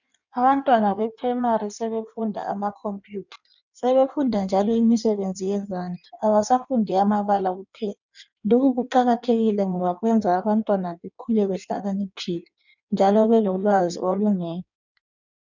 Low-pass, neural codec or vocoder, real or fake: 7.2 kHz; codec, 16 kHz in and 24 kHz out, 1.1 kbps, FireRedTTS-2 codec; fake